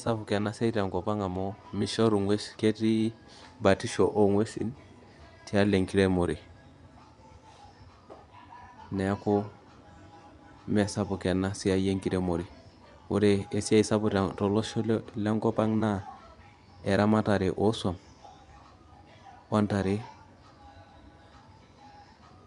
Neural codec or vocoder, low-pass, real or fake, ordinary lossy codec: vocoder, 24 kHz, 100 mel bands, Vocos; 10.8 kHz; fake; MP3, 96 kbps